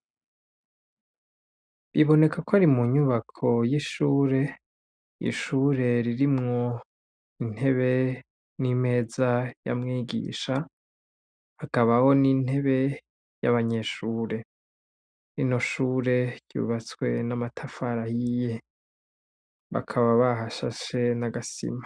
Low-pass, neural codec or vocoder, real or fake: 9.9 kHz; none; real